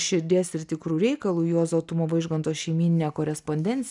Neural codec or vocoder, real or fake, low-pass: none; real; 10.8 kHz